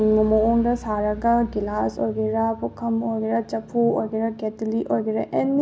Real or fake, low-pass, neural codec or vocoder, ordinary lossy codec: real; none; none; none